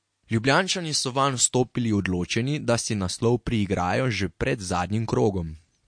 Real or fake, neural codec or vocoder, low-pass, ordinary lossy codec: real; none; 9.9 kHz; MP3, 48 kbps